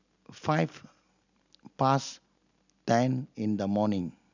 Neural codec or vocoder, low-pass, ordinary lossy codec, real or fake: none; 7.2 kHz; none; real